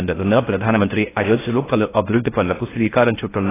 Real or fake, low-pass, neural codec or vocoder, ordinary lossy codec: fake; 3.6 kHz; codec, 16 kHz in and 24 kHz out, 0.6 kbps, FocalCodec, streaming, 4096 codes; AAC, 16 kbps